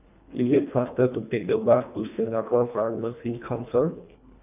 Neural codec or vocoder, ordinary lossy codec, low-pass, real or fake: codec, 24 kHz, 1.5 kbps, HILCodec; none; 3.6 kHz; fake